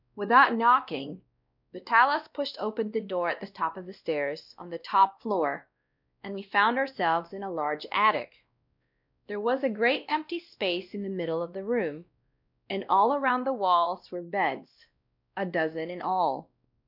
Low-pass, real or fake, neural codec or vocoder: 5.4 kHz; fake; codec, 16 kHz, 1 kbps, X-Codec, WavLM features, trained on Multilingual LibriSpeech